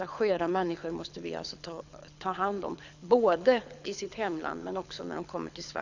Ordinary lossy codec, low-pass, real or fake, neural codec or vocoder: none; 7.2 kHz; fake; codec, 24 kHz, 6 kbps, HILCodec